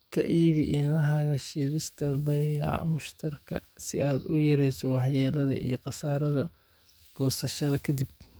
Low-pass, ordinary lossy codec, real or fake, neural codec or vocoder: none; none; fake; codec, 44.1 kHz, 2.6 kbps, SNAC